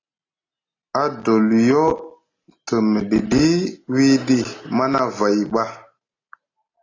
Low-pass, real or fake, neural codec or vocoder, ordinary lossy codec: 7.2 kHz; real; none; AAC, 32 kbps